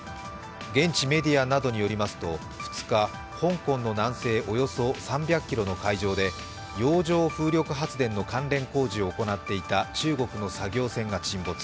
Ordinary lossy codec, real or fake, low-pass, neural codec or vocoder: none; real; none; none